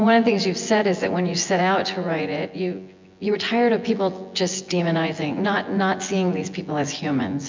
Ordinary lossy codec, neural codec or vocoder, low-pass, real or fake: MP3, 64 kbps; vocoder, 24 kHz, 100 mel bands, Vocos; 7.2 kHz; fake